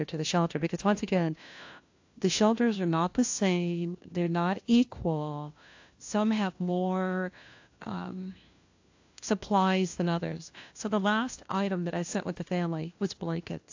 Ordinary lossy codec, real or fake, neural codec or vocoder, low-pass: AAC, 48 kbps; fake; codec, 16 kHz, 1 kbps, FunCodec, trained on LibriTTS, 50 frames a second; 7.2 kHz